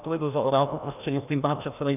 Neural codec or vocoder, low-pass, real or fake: codec, 16 kHz, 1 kbps, FreqCodec, larger model; 3.6 kHz; fake